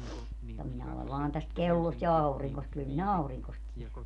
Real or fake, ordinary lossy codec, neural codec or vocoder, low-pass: real; none; none; 10.8 kHz